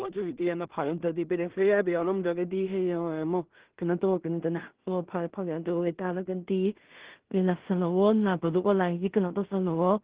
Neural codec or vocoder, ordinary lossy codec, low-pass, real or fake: codec, 16 kHz in and 24 kHz out, 0.4 kbps, LongCat-Audio-Codec, two codebook decoder; Opus, 16 kbps; 3.6 kHz; fake